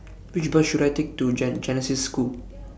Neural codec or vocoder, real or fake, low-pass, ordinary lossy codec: none; real; none; none